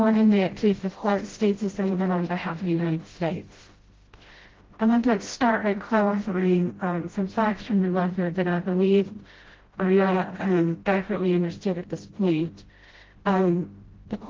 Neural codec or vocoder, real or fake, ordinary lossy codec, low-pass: codec, 16 kHz, 0.5 kbps, FreqCodec, smaller model; fake; Opus, 16 kbps; 7.2 kHz